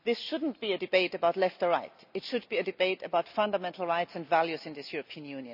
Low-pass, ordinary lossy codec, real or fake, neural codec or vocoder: 5.4 kHz; none; real; none